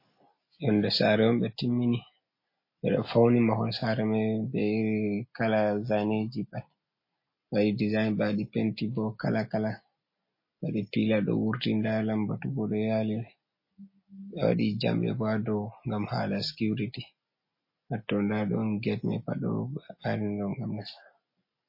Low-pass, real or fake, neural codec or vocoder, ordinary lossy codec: 5.4 kHz; real; none; MP3, 24 kbps